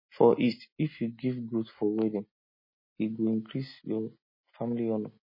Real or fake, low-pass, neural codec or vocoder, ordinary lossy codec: real; 5.4 kHz; none; MP3, 24 kbps